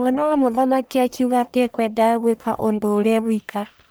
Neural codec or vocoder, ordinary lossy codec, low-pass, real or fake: codec, 44.1 kHz, 1.7 kbps, Pupu-Codec; none; none; fake